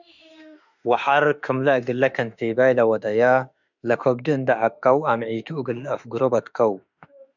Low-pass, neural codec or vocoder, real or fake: 7.2 kHz; autoencoder, 48 kHz, 32 numbers a frame, DAC-VAE, trained on Japanese speech; fake